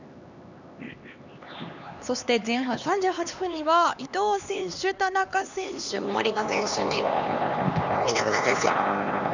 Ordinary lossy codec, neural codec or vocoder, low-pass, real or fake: none; codec, 16 kHz, 2 kbps, X-Codec, HuBERT features, trained on LibriSpeech; 7.2 kHz; fake